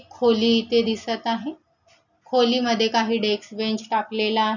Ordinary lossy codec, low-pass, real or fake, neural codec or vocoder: none; 7.2 kHz; real; none